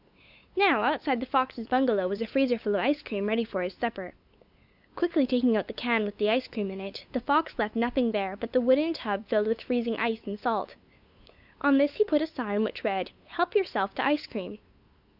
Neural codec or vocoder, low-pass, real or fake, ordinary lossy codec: codec, 16 kHz, 8 kbps, FunCodec, trained on LibriTTS, 25 frames a second; 5.4 kHz; fake; Opus, 64 kbps